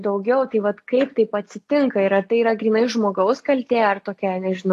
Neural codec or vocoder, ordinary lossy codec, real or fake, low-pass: none; AAC, 48 kbps; real; 14.4 kHz